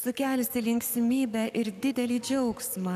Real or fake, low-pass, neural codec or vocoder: fake; 14.4 kHz; codec, 44.1 kHz, 7.8 kbps, Pupu-Codec